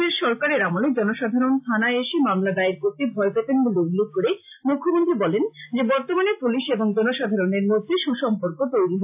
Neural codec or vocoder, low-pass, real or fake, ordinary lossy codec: none; 3.6 kHz; real; AAC, 32 kbps